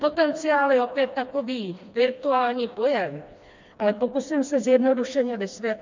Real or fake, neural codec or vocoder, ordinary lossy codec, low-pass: fake; codec, 16 kHz, 2 kbps, FreqCodec, smaller model; MP3, 64 kbps; 7.2 kHz